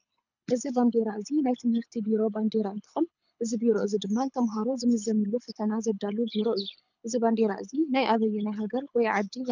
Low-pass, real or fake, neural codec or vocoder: 7.2 kHz; fake; codec, 24 kHz, 6 kbps, HILCodec